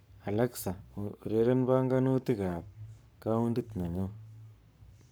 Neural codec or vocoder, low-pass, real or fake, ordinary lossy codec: codec, 44.1 kHz, 7.8 kbps, Pupu-Codec; none; fake; none